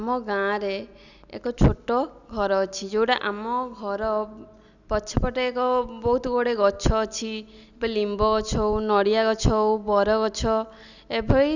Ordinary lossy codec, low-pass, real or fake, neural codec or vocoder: none; 7.2 kHz; real; none